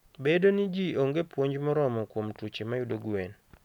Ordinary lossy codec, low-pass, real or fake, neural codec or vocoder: none; 19.8 kHz; real; none